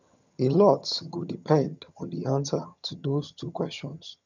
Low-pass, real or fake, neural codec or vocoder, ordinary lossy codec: 7.2 kHz; fake; vocoder, 22.05 kHz, 80 mel bands, HiFi-GAN; none